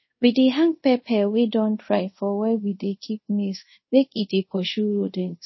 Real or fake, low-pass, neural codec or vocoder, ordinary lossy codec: fake; 7.2 kHz; codec, 24 kHz, 0.5 kbps, DualCodec; MP3, 24 kbps